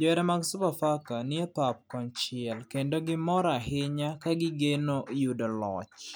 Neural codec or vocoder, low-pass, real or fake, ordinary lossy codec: none; none; real; none